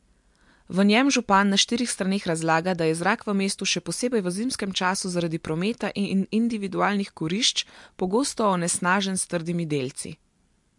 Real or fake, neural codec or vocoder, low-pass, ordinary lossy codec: real; none; 10.8 kHz; MP3, 64 kbps